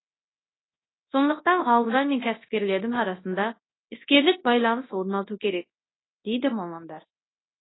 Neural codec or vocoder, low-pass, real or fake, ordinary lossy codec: codec, 24 kHz, 0.9 kbps, WavTokenizer, large speech release; 7.2 kHz; fake; AAC, 16 kbps